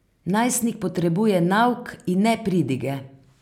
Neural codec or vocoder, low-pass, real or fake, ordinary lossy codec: none; 19.8 kHz; real; none